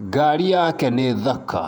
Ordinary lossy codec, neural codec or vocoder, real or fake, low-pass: none; vocoder, 48 kHz, 128 mel bands, Vocos; fake; 19.8 kHz